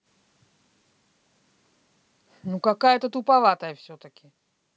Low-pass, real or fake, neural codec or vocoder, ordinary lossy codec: none; real; none; none